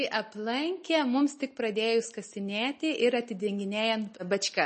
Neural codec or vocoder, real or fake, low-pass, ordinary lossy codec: none; real; 9.9 kHz; MP3, 32 kbps